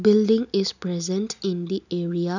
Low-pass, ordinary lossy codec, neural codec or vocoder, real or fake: 7.2 kHz; none; none; real